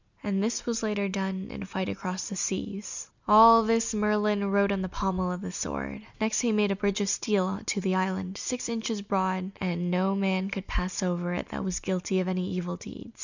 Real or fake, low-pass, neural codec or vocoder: real; 7.2 kHz; none